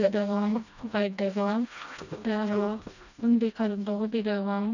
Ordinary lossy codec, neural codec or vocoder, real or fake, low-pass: none; codec, 16 kHz, 1 kbps, FreqCodec, smaller model; fake; 7.2 kHz